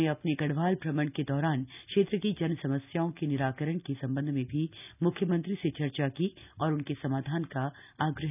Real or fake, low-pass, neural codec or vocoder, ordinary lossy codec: real; 3.6 kHz; none; none